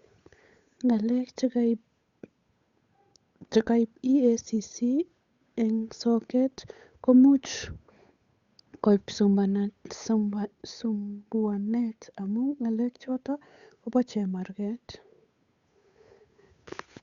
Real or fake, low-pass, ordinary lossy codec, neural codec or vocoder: fake; 7.2 kHz; Opus, 64 kbps; codec, 16 kHz, 8 kbps, FunCodec, trained on Chinese and English, 25 frames a second